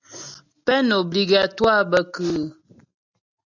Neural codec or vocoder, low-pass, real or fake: none; 7.2 kHz; real